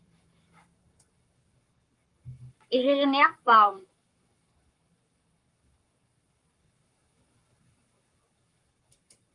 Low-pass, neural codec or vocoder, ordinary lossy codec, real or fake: 10.8 kHz; codec, 44.1 kHz, 7.8 kbps, Pupu-Codec; Opus, 32 kbps; fake